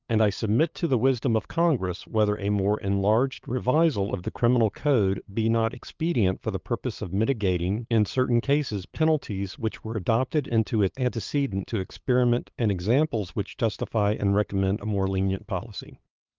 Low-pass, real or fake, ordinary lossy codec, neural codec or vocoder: 7.2 kHz; fake; Opus, 32 kbps; codec, 16 kHz, 8 kbps, FunCodec, trained on LibriTTS, 25 frames a second